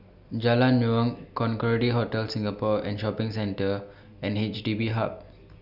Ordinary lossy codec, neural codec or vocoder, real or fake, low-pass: none; none; real; 5.4 kHz